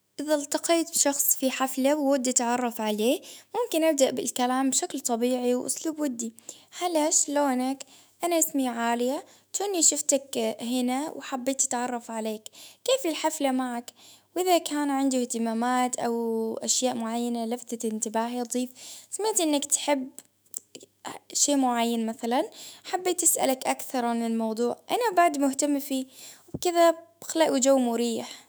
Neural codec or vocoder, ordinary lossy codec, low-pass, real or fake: autoencoder, 48 kHz, 128 numbers a frame, DAC-VAE, trained on Japanese speech; none; none; fake